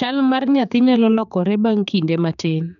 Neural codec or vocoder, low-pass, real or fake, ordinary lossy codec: codec, 16 kHz, 4 kbps, X-Codec, HuBERT features, trained on general audio; 7.2 kHz; fake; none